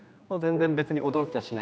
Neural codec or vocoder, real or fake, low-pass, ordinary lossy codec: codec, 16 kHz, 2 kbps, X-Codec, HuBERT features, trained on general audio; fake; none; none